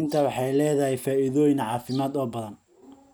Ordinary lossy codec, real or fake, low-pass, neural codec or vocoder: none; real; none; none